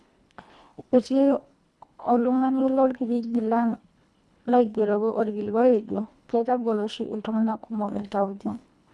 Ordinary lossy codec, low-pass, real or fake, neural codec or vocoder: none; none; fake; codec, 24 kHz, 1.5 kbps, HILCodec